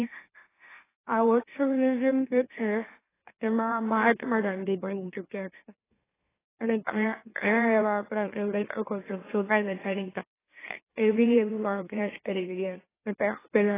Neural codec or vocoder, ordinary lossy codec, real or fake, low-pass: autoencoder, 44.1 kHz, a latent of 192 numbers a frame, MeloTTS; AAC, 16 kbps; fake; 3.6 kHz